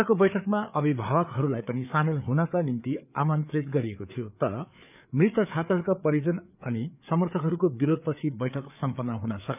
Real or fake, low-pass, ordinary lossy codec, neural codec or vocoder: fake; 3.6 kHz; none; codec, 16 kHz, 4 kbps, FreqCodec, larger model